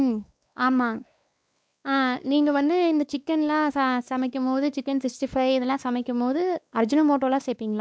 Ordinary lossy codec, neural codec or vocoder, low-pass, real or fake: none; codec, 16 kHz, 2 kbps, X-Codec, WavLM features, trained on Multilingual LibriSpeech; none; fake